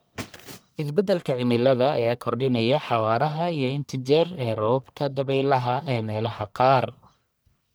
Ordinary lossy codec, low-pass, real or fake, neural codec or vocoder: none; none; fake; codec, 44.1 kHz, 1.7 kbps, Pupu-Codec